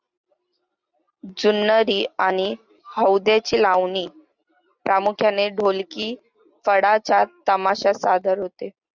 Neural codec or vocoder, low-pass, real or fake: none; 7.2 kHz; real